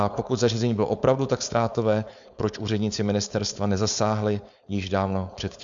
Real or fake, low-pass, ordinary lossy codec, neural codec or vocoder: fake; 7.2 kHz; Opus, 64 kbps; codec, 16 kHz, 4.8 kbps, FACodec